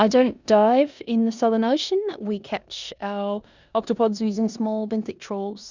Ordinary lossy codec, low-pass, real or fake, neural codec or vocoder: Opus, 64 kbps; 7.2 kHz; fake; codec, 16 kHz in and 24 kHz out, 0.9 kbps, LongCat-Audio-Codec, four codebook decoder